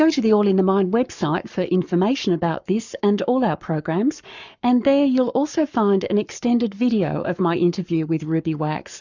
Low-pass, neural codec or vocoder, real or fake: 7.2 kHz; codec, 44.1 kHz, 7.8 kbps, Pupu-Codec; fake